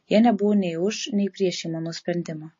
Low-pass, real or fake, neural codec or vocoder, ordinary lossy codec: 7.2 kHz; real; none; MP3, 32 kbps